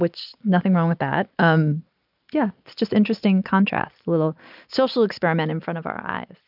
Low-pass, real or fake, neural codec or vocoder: 5.4 kHz; real; none